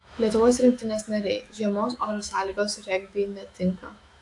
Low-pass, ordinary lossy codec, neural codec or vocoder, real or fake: 10.8 kHz; AAC, 64 kbps; autoencoder, 48 kHz, 128 numbers a frame, DAC-VAE, trained on Japanese speech; fake